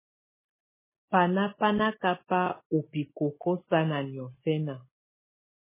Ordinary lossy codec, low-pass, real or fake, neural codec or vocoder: MP3, 16 kbps; 3.6 kHz; real; none